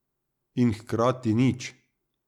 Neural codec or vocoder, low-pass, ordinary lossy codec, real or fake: none; 19.8 kHz; none; real